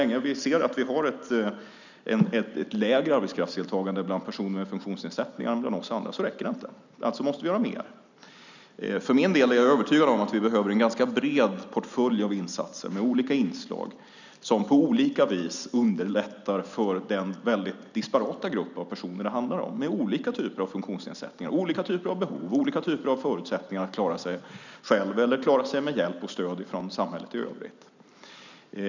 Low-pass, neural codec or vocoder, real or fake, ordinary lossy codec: 7.2 kHz; none; real; none